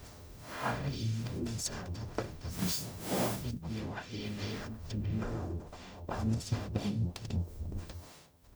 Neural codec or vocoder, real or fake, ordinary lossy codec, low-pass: codec, 44.1 kHz, 0.9 kbps, DAC; fake; none; none